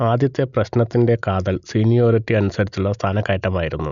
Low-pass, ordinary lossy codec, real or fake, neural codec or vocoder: 7.2 kHz; none; real; none